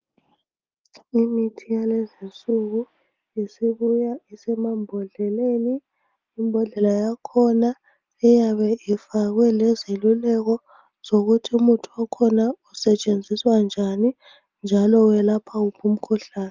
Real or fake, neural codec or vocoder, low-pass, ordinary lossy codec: real; none; 7.2 kHz; Opus, 32 kbps